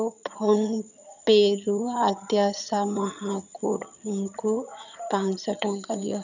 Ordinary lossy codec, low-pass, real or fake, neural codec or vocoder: none; 7.2 kHz; fake; vocoder, 22.05 kHz, 80 mel bands, HiFi-GAN